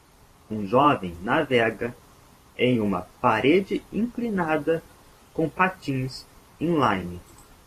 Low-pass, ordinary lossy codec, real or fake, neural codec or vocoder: 14.4 kHz; AAC, 48 kbps; fake; vocoder, 44.1 kHz, 128 mel bands every 512 samples, BigVGAN v2